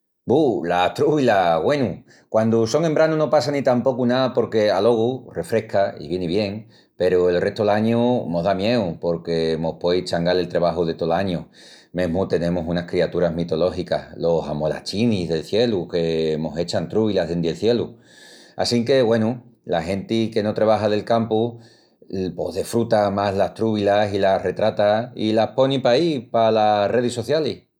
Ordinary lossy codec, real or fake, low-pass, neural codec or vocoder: none; real; 19.8 kHz; none